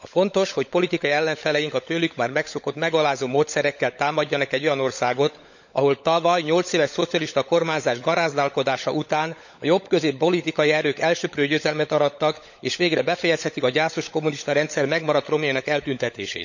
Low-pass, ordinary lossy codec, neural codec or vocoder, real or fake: 7.2 kHz; none; codec, 16 kHz, 16 kbps, FunCodec, trained on LibriTTS, 50 frames a second; fake